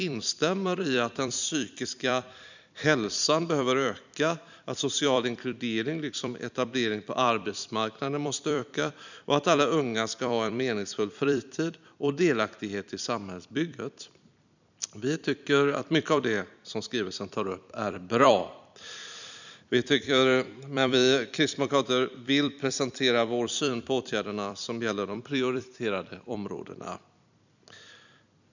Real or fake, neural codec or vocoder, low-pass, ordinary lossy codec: fake; vocoder, 44.1 kHz, 128 mel bands every 256 samples, BigVGAN v2; 7.2 kHz; none